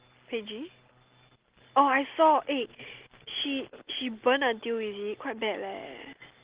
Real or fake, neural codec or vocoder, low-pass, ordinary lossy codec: real; none; 3.6 kHz; Opus, 16 kbps